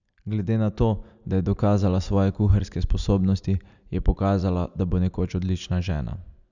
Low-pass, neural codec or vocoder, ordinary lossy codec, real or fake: 7.2 kHz; none; none; real